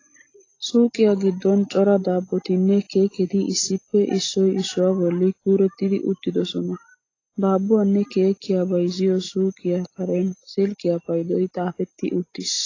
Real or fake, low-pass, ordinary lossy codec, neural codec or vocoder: real; 7.2 kHz; AAC, 32 kbps; none